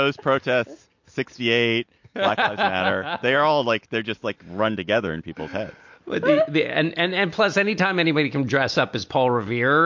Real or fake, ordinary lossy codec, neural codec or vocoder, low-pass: real; MP3, 48 kbps; none; 7.2 kHz